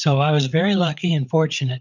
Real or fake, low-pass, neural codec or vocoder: fake; 7.2 kHz; codec, 16 kHz, 8 kbps, FreqCodec, larger model